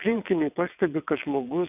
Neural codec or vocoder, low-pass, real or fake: vocoder, 22.05 kHz, 80 mel bands, WaveNeXt; 3.6 kHz; fake